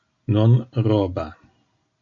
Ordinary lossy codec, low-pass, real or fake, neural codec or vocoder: AAC, 64 kbps; 7.2 kHz; real; none